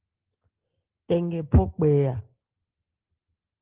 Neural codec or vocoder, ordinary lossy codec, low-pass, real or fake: none; Opus, 16 kbps; 3.6 kHz; real